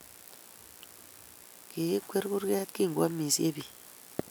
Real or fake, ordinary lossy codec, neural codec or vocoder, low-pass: real; none; none; none